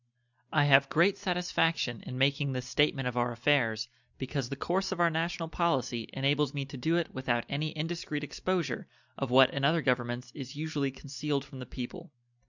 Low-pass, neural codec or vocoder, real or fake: 7.2 kHz; none; real